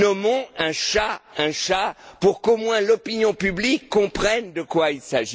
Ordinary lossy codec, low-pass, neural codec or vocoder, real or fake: none; none; none; real